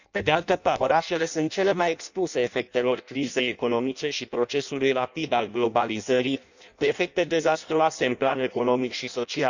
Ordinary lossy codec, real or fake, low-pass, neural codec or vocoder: none; fake; 7.2 kHz; codec, 16 kHz in and 24 kHz out, 0.6 kbps, FireRedTTS-2 codec